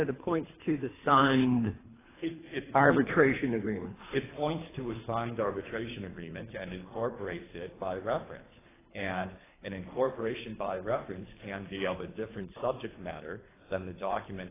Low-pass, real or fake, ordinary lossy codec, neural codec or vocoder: 3.6 kHz; fake; AAC, 16 kbps; codec, 24 kHz, 3 kbps, HILCodec